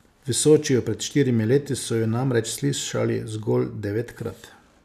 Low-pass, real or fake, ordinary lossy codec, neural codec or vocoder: 14.4 kHz; real; none; none